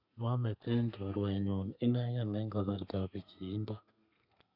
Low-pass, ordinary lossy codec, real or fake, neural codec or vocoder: 5.4 kHz; none; fake; codec, 32 kHz, 1.9 kbps, SNAC